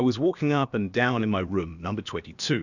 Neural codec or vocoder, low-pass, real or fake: codec, 16 kHz, about 1 kbps, DyCAST, with the encoder's durations; 7.2 kHz; fake